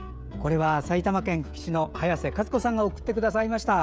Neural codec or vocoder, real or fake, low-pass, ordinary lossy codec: codec, 16 kHz, 16 kbps, FreqCodec, smaller model; fake; none; none